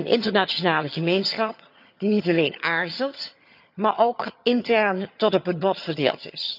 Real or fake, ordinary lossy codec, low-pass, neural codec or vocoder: fake; none; 5.4 kHz; vocoder, 22.05 kHz, 80 mel bands, HiFi-GAN